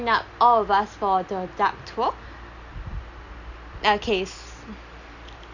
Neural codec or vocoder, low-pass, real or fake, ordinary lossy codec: none; 7.2 kHz; real; none